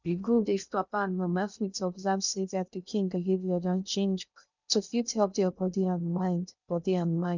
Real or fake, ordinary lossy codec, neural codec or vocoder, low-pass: fake; none; codec, 16 kHz in and 24 kHz out, 0.6 kbps, FocalCodec, streaming, 2048 codes; 7.2 kHz